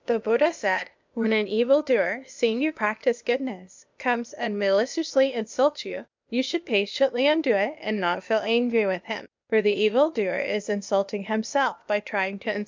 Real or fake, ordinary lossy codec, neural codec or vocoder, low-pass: fake; MP3, 64 kbps; codec, 16 kHz, 0.8 kbps, ZipCodec; 7.2 kHz